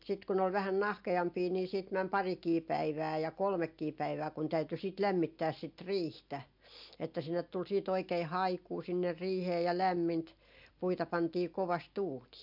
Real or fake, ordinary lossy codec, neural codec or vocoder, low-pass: real; MP3, 48 kbps; none; 5.4 kHz